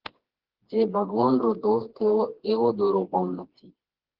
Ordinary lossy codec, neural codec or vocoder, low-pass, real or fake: Opus, 16 kbps; codec, 16 kHz, 2 kbps, FreqCodec, smaller model; 5.4 kHz; fake